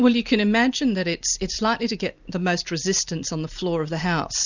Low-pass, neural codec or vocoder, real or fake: 7.2 kHz; none; real